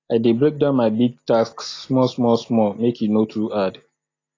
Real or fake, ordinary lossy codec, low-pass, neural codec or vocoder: real; AAC, 32 kbps; 7.2 kHz; none